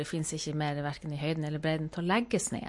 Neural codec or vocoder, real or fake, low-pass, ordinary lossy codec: none; real; 10.8 kHz; MP3, 48 kbps